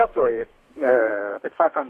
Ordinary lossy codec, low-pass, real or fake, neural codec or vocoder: AAC, 48 kbps; 14.4 kHz; fake; codec, 32 kHz, 1.9 kbps, SNAC